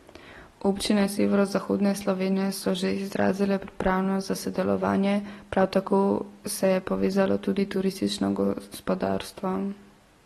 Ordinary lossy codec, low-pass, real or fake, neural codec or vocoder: AAC, 32 kbps; 19.8 kHz; real; none